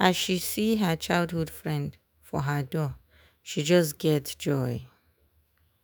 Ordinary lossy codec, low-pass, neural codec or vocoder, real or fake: none; none; autoencoder, 48 kHz, 128 numbers a frame, DAC-VAE, trained on Japanese speech; fake